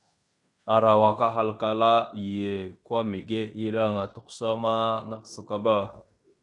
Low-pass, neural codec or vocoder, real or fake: 10.8 kHz; codec, 16 kHz in and 24 kHz out, 0.9 kbps, LongCat-Audio-Codec, fine tuned four codebook decoder; fake